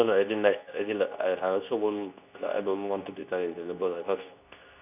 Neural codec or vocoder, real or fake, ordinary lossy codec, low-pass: codec, 24 kHz, 0.9 kbps, WavTokenizer, medium speech release version 2; fake; none; 3.6 kHz